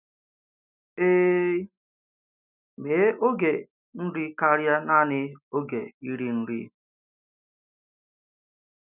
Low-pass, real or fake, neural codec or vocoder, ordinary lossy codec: 3.6 kHz; real; none; none